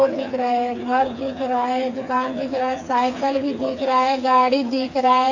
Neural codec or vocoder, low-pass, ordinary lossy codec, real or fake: codec, 16 kHz, 4 kbps, FreqCodec, smaller model; 7.2 kHz; AAC, 48 kbps; fake